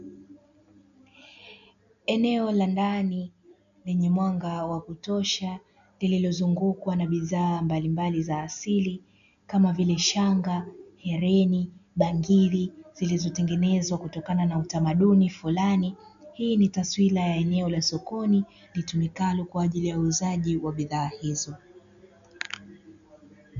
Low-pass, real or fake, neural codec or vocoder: 7.2 kHz; real; none